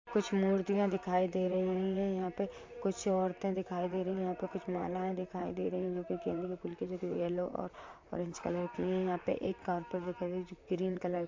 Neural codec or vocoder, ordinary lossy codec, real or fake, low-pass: vocoder, 44.1 kHz, 128 mel bands, Pupu-Vocoder; MP3, 48 kbps; fake; 7.2 kHz